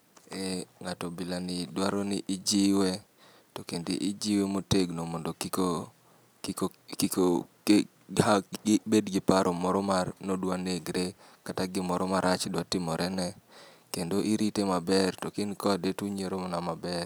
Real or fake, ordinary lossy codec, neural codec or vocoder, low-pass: real; none; none; none